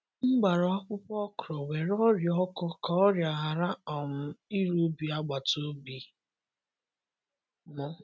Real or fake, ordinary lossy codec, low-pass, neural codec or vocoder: real; none; none; none